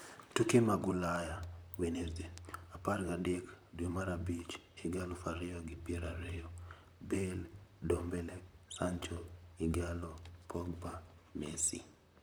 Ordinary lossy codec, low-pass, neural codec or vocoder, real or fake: none; none; vocoder, 44.1 kHz, 128 mel bands, Pupu-Vocoder; fake